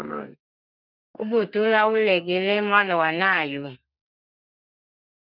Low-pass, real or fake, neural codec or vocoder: 5.4 kHz; fake; codec, 32 kHz, 1.9 kbps, SNAC